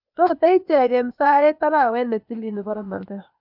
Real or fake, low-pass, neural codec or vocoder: fake; 5.4 kHz; codec, 16 kHz, 0.8 kbps, ZipCodec